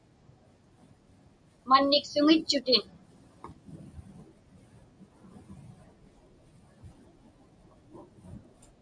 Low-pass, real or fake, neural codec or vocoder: 9.9 kHz; real; none